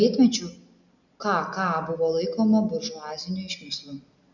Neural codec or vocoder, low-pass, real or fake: none; 7.2 kHz; real